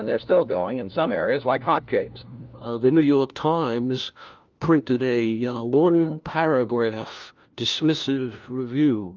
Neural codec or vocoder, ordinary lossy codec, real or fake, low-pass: codec, 16 kHz, 1 kbps, FunCodec, trained on LibriTTS, 50 frames a second; Opus, 24 kbps; fake; 7.2 kHz